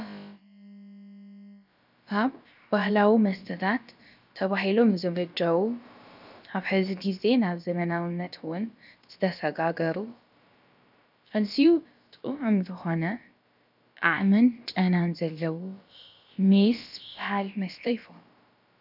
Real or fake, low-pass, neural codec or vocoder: fake; 5.4 kHz; codec, 16 kHz, about 1 kbps, DyCAST, with the encoder's durations